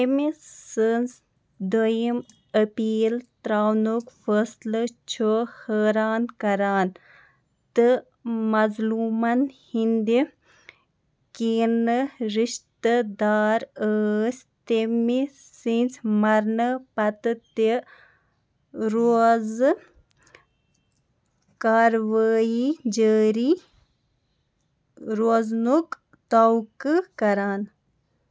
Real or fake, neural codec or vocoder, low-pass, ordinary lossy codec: real; none; none; none